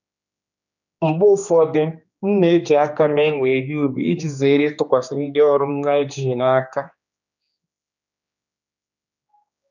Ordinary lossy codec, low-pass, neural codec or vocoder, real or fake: none; 7.2 kHz; codec, 16 kHz, 2 kbps, X-Codec, HuBERT features, trained on general audio; fake